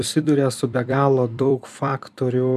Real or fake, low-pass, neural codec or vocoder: fake; 14.4 kHz; vocoder, 44.1 kHz, 128 mel bands every 256 samples, BigVGAN v2